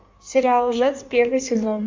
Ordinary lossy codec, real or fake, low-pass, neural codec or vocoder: MP3, 64 kbps; fake; 7.2 kHz; codec, 16 kHz in and 24 kHz out, 1.1 kbps, FireRedTTS-2 codec